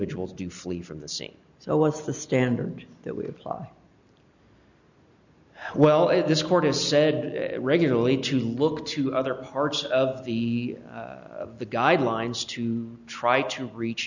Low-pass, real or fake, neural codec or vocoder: 7.2 kHz; real; none